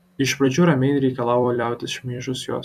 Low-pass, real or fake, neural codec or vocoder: 14.4 kHz; fake; vocoder, 44.1 kHz, 128 mel bands every 256 samples, BigVGAN v2